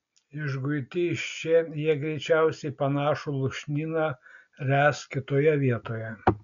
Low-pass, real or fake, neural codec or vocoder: 7.2 kHz; real; none